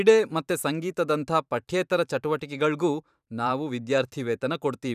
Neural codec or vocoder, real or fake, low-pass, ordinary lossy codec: vocoder, 44.1 kHz, 128 mel bands every 512 samples, BigVGAN v2; fake; 14.4 kHz; none